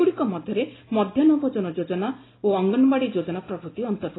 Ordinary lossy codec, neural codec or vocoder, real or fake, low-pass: AAC, 16 kbps; none; real; 7.2 kHz